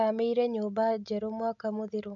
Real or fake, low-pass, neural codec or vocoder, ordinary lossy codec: real; 7.2 kHz; none; none